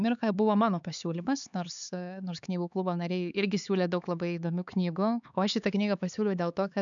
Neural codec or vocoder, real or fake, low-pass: codec, 16 kHz, 4 kbps, X-Codec, HuBERT features, trained on LibriSpeech; fake; 7.2 kHz